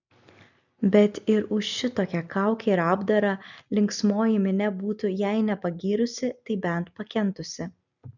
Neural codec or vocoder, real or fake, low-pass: none; real; 7.2 kHz